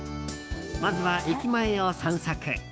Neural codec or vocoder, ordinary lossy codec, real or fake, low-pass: codec, 16 kHz, 6 kbps, DAC; none; fake; none